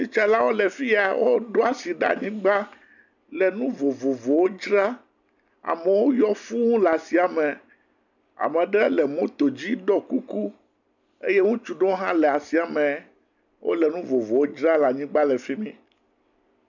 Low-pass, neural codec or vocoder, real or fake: 7.2 kHz; none; real